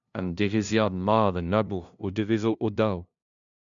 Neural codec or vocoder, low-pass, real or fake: codec, 16 kHz, 0.5 kbps, FunCodec, trained on LibriTTS, 25 frames a second; 7.2 kHz; fake